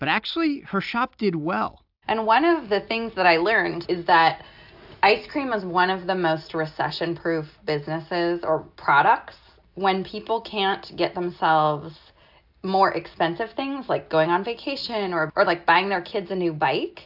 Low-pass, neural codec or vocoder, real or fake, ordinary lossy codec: 5.4 kHz; none; real; AAC, 48 kbps